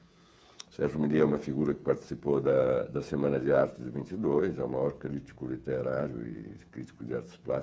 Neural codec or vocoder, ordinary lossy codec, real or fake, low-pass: codec, 16 kHz, 8 kbps, FreqCodec, smaller model; none; fake; none